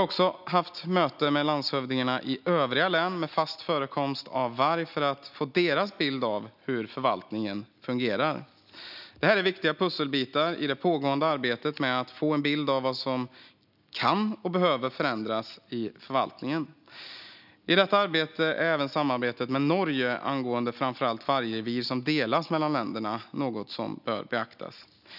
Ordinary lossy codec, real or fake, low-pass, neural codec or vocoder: none; real; 5.4 kHz; none